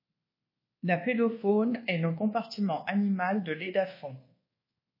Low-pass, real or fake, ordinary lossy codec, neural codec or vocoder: 5.4 kHz; fake; MP3, 24 kbps; codec, 24 kHz, 1.2 kbps, DualCodec